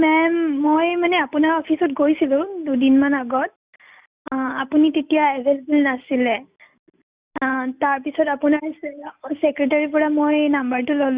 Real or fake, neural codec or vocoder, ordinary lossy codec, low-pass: real; none; Opus, 64 kbps; 3.6 kHz